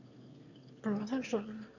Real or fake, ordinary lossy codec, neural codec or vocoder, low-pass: fake; none; autoencoder, 22.05 kHz, a latent of 192 numbers a frame, VITS, trained on one speaker; 7.2 kHz